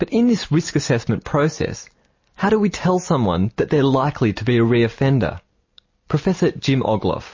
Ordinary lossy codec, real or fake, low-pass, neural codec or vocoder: MP3, 32 kbps; real; 7.2 kHz; none